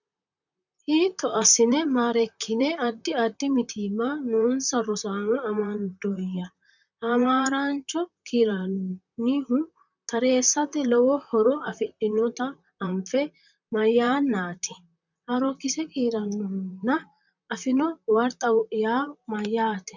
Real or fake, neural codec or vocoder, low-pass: fake; vocoder, 44.1 kHz, 128 mel bands, Pupu-Vocoder; 7.2 kHz